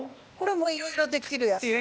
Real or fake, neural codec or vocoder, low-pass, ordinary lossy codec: fake; codec, 16 kHz, 0.8 kbps, ZipCodec; none; none